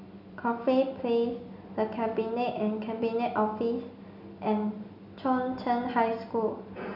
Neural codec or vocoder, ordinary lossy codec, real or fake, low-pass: none; none; real; 5.4 kHz